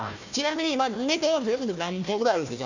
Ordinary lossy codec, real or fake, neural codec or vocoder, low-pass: none; fake; codec, 16 kHz, 1 kbps, FunCodec, trained on Chinese and English, 50 frames a second; 7.2 kHz